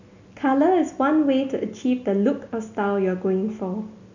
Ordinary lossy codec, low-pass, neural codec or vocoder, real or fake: none; 7.2 kHz; none; real